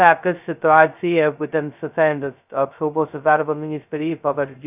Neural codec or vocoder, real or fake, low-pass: codec, 16 kHz, 0.2 kbps, FocalCodec; fake; 3.6 kHz